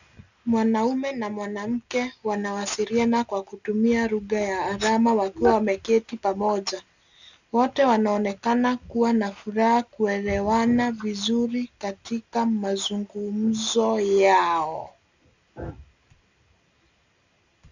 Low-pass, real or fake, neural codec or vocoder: 7.2 kHz; real; none